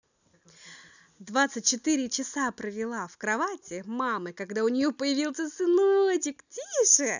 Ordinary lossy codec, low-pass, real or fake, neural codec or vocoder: none; 7.2 kHz; real; none